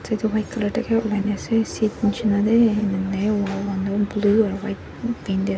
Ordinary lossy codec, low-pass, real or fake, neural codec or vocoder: none; none; real; none